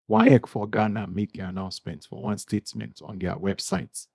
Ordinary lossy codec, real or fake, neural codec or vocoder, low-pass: none; fake; codec, 24 kHz, 0.9 kbps, WavTokenizer, small release; none